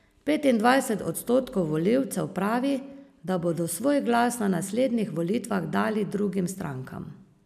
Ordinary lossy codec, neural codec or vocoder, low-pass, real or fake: none; none; 14.4 kHz; real